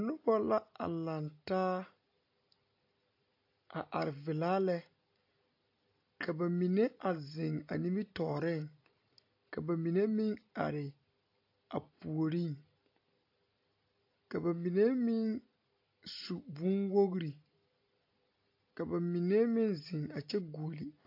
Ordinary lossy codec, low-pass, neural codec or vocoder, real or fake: AAC, 32 kbps; 5.4 kHz; none; real